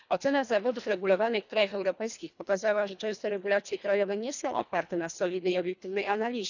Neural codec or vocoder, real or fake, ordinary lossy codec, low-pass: codec, 24 kHz, 1.5 kbps, HILCodec; fake; none; 7.2 kHz